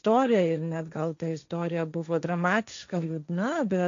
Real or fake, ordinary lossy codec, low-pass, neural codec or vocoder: fake; AAC, 64 kbps; 7.2 kHz; codec, 16 kHz, 1.1 kbps, Voila-Tokenizer